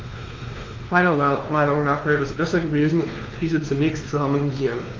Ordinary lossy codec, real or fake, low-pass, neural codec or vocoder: Opus, 32 kbps; fake; 7.2 kHz; codec, 16 kHz, 2 kbps, X-Codec, WavLM features, trained on Multilingual LibriSpeech